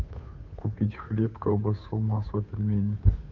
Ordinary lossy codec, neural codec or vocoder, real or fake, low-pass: none; codec, 16 kHz, 2 kbps, FunCodec, trained on Chinese and English, 25 frames a second; fake; 7.2 kHz